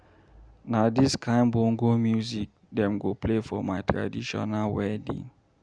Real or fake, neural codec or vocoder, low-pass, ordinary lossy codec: real; none; 9.9 kHz; Opus, 64 kbps